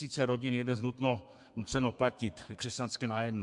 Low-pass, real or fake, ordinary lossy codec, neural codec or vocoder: 10.8 kHz; fake; MP3, 64 kbps; codec, 32 kHz, 1.9 kbps, SNAC